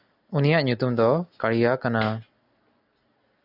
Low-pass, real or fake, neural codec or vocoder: 5.4 kHz; real; none